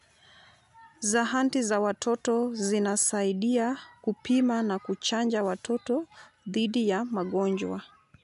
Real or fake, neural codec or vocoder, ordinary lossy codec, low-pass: real; none; none; 10.8 kHz